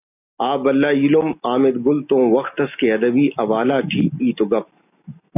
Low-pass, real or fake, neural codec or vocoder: 3.6 kHz; real; none